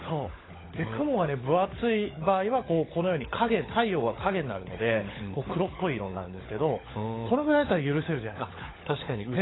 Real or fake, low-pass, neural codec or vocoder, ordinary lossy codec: fake; 7.2 kHz; codec, 16 kHz, 16 kbps, FunCodec, trained on LibriTTS, 50 frames a second; AAC, 16 kbps